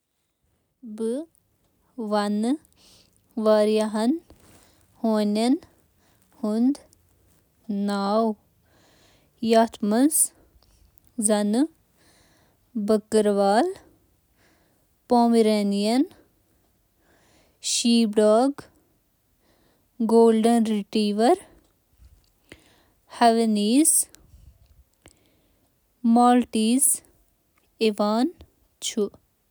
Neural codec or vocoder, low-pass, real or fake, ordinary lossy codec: none; none; real; none